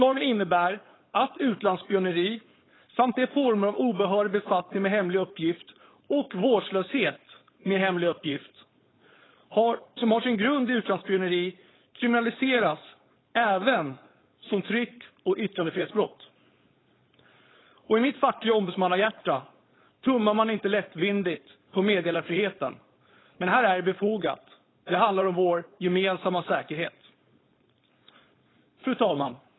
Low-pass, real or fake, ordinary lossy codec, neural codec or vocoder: 7.2 kHz; fake; AAC, 16 kbps; codec, 16 kHz, 4.8 kbps, FACodec